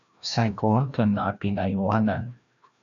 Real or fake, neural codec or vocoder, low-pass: fake; codec, 16 kHz, 1 kbps, FreqCodec, larger model; 7.2 kHz